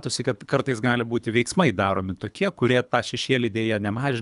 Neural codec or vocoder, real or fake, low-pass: codec, 24 kHz, 3 kbps, HILCodec; fake; 10.8 kHz